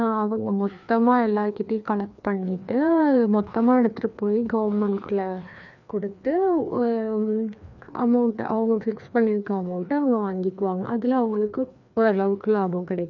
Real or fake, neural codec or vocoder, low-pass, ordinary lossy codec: fake; codec, 16 kHz, 2 kbps, FreqCodec, larger model; 7.2 kHz; none